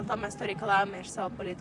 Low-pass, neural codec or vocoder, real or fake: 10.8 kHz; vocoder, 44.1 kHz, 128 mel bands, Pupu-Vocoder; fake